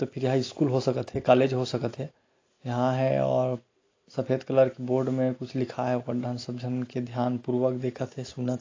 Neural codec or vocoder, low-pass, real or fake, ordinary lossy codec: none; 7.2 kHz; real; AAC, 32 kbps